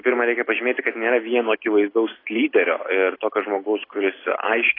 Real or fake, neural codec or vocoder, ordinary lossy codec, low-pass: real; none; AAC, 24 kbps; 5.4 kHz